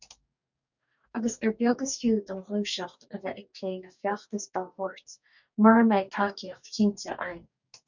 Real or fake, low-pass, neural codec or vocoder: fake; 7.2 kHz; codec, 44.1 kHz, 2.6 kbps, DAC